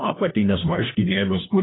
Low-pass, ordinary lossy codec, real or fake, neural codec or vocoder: 7.2 kHz; AAC, 16 kbps; fake; codec, 16 kHz, 1 kbps, FunCodec, trained on LibriTTS, 50 frames a second